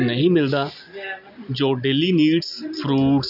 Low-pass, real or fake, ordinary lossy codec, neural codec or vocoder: 5.4 kHz; real; none; none